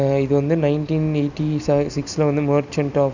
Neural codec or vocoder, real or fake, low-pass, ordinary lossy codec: none; real; 7.2 kHz; none